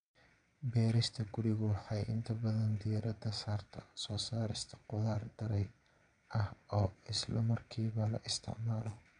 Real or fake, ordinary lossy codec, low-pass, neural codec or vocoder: fake; none; 9.9 kHz; vocoder, 22.05 kHz, 80 mel bands, Vocos